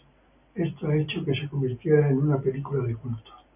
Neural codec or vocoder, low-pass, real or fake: none; 3.6 kHz; real